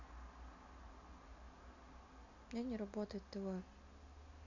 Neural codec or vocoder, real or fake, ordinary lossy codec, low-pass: none; real; none; 7.2 kHz